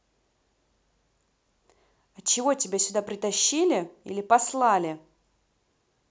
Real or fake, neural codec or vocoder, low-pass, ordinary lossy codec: real; none; none; none